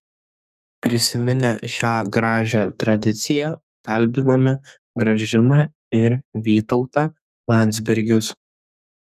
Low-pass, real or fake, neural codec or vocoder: 14.4 kHz; fake; codec, 32 kHz, 1.9 kbps, SNAC